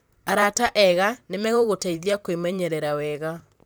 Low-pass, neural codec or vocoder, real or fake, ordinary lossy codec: none; vocoder, 44.1 kHz, 128 mel bands, Pupu-Vocoder; fake; none